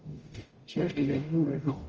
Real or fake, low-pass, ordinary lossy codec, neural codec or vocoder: fake; 7.2 kHz; Opus, 24 kbps; codec, 44.1 kHz, 0.9 kbps, DAC